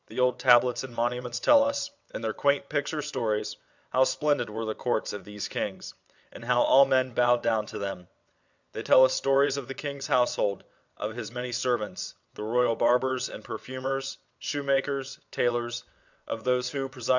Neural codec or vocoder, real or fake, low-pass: vocoder, 22.05 kHz, 80 mel bands, WaveNeXt; fake; 7.2 kHz